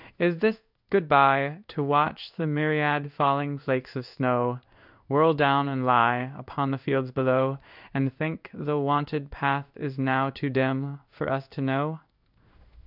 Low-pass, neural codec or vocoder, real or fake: 5.4 kHz; codec, 16 kHz in and 24 kHz out, 1 kbps, XY-Tokenizer; fake